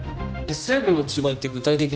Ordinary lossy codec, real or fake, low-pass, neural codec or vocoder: none; fake; none; codec, 16 kHz, 1 kbps, X-Codec, HuBERT features, trained on general audio